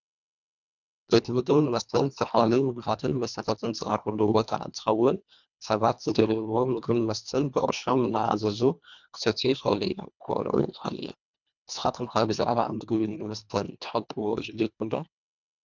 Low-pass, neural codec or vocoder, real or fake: 7.2 kHz; codec, 24 kHz, 1.5 kbps, HILCodec; fake